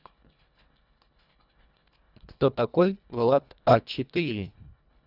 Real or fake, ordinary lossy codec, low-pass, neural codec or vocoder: fake; none; 5.4 kHz; codec, 24 kHz, 1.5 kbps, HILCodec